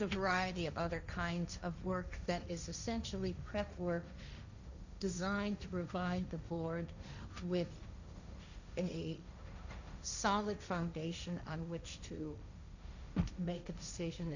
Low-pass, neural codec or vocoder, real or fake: 7.2 kHz; codec, 16 kHz, 1.1 kbps, Voila-Tokenizer; fake